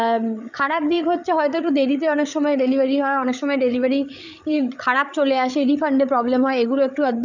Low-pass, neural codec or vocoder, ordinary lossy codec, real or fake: 7.2 kHz; codec, 16 kHz, 8 kbps, FreqCodec, larger model; none; fake